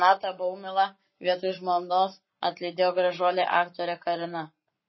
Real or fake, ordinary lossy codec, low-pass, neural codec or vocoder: fake; MP3, 24 kbps; 7.2 kHz; codec, 44.1 kHz, 7.8 kbps, Pupu-Codec